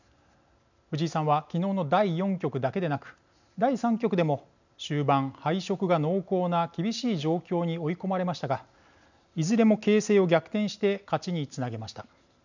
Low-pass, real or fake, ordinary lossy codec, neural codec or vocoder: 7.2 kHz; real; none; none